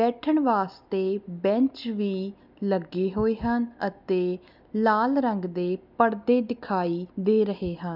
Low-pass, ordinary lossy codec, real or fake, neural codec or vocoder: 5.4 kHz; none; real; none